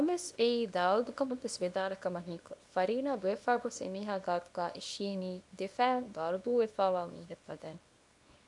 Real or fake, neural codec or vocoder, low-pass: fake; codec, 24 kHz, 0.9 kbps, WavTokenizer, small release; 10.8 kHz